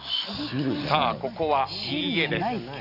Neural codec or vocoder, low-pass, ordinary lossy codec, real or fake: none; 5.4 kHz; none; real